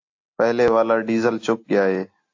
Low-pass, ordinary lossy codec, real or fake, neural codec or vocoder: 7.2 kHz; AAC, 48 kbps; real; none